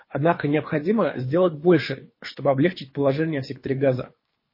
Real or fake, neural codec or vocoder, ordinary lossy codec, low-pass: fake; codec, 24 kHz, 3 kbps, HILCodec; MP3, 24 kbps; 5.4 kHz